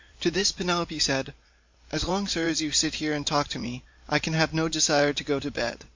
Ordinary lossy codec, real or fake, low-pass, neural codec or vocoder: MP3, 48 kbps; fake; 7.2 kHz; vocoder, 44.1 kHz, 128 mel bands every 512 samples, BigVGAN v2